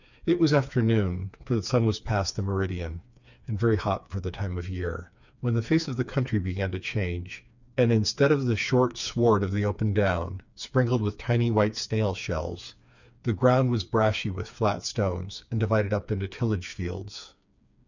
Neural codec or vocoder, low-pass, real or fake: codec, 16 kHz, 4 kbps, FreqCodec, smaller model; 7.2 kHz; fake